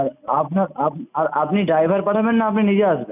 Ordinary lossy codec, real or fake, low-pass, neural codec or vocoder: none; real; 3.6 kHz; none